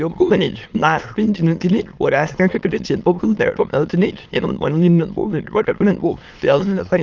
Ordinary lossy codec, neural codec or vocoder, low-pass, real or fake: Opus, 24 kbps; autoencoder, 22.05 kHz, a latent of 192 numbers a frame, VITS, trained on many speakers; 7.2 kHz; fake